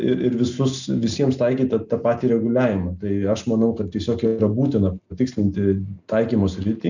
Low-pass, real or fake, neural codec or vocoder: 7.2 kHz; real; none